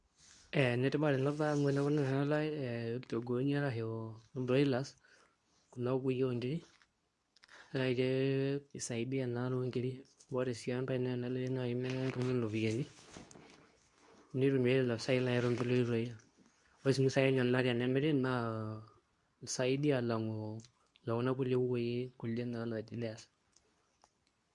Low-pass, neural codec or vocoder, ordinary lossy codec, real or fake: 10.8 kHz; codec, 24 kHz, 0.9 kbps, WavTokenizer, medium speech release version 2; none; fake